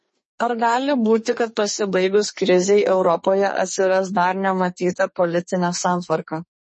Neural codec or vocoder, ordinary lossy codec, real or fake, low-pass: codec, 44.1 kHz, 2.6 kbps, SNAC; MP3, 32 kbps; fake; 10.8 kHz